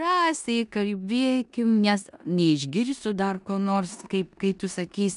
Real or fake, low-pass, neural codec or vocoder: fake; 10.8 kHz; codec, 16 kHz in and 24 kHz out, 0.9 kbps, LongCat-Audio-Codec, four codebook decoder